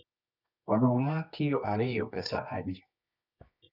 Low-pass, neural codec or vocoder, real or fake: 5.4 kHz; codec, 24 kHz, 0.9 kbps, WavTokenizer, medium music audio release; fake